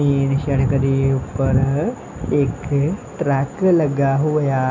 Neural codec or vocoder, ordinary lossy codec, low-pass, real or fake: none; none; 7.2 kHz; real